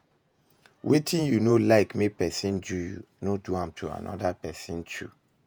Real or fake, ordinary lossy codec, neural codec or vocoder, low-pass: fake; none; vocoder, 48 kHz, 128 mel bands, Vocos; none